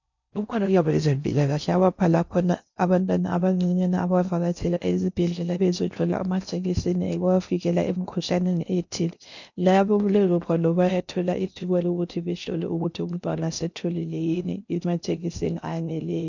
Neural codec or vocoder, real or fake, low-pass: codec, 16 kHz in and 24 kHz out, 0.6 kbps, FocalCodec, streaming, 4096 codes; fake; 7.2 kHz